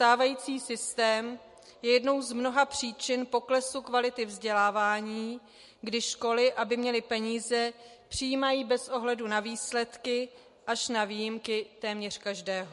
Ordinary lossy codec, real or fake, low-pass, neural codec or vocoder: MP3, 48 kbps; real; 14.4 kHz; none